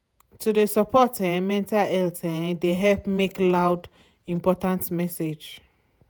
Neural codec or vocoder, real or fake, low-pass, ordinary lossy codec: vocoder, 48 kHz, 128 mel bands, Vocos; fake; none; none